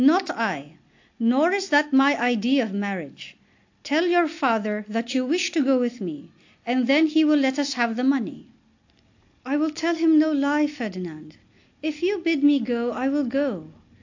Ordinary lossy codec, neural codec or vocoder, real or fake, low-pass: AAC, 48 kbps; none; real; 7.2 kHz